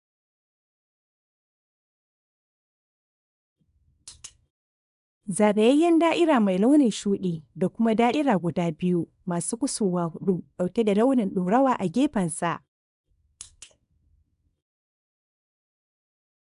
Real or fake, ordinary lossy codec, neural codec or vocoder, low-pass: fake; none; codec, 24 kHz, 0.9 kbps, WavTokenizer, small release; 10.8 kHz